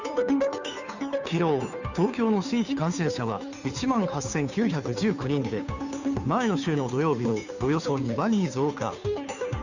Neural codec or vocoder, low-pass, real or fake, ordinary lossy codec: codec, 16 kHz, 2 kbps, FunCodec, trained on Chinese and English, 25 frames a second; 7.2 kHz; fake; none